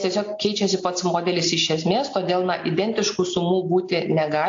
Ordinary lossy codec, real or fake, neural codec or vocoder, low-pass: AAC, 48 kbps; real; none; 7.2 kHz